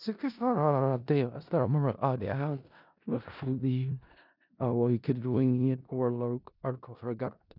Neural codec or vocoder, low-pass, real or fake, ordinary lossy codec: codec, 16 kHz in and 24 kHz out, 0.4 kbps, LongCat-Audio-Codec, four codebook decoder; 5.4 kHz; fake; none